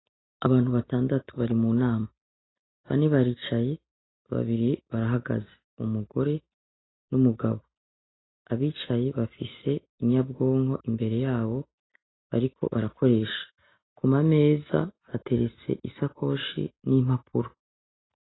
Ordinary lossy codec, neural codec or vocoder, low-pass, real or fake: AAC, 16 kbps; none; 7.2 kHz; real